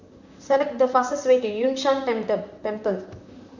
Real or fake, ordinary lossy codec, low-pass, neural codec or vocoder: fake; none; 7.2 kHz; vocoder, 44.1 kHz, 128 mel bands, Pupu-Vocoder